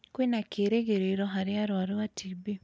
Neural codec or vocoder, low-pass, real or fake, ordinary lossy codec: none; none; real; none